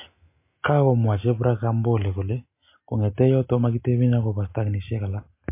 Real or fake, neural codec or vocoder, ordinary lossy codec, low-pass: real; none; MP3, 24 kbps; 3.6 kHz